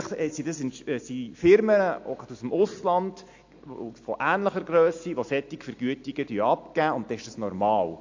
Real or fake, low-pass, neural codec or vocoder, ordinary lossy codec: real; 7.2 kHz; none; AAC, 48 kbps